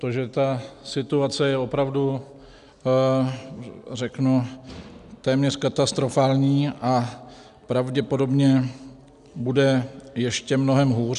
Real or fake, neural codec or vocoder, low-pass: real; none; 10.8 kHz